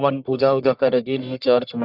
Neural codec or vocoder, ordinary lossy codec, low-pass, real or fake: codec, 44.1 kHz, 1.7 kbps, Pupu-Codec; none; 5.4 kHz; fake